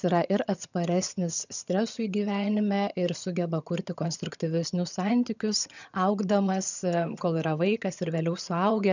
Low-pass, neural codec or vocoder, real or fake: 7.2 kHz; vocoder, 22.05 kHz, 80 mel bands, HiFi-GAN; fake